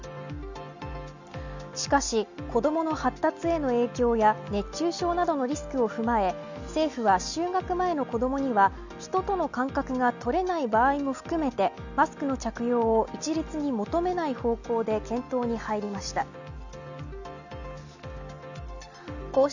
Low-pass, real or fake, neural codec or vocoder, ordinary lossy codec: 7.2 kHz; real; none; none